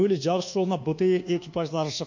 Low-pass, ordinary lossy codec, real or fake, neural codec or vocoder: 7.2 kHz; none; fake; codec, 24 kHz, 1.2 kbps, DualCodec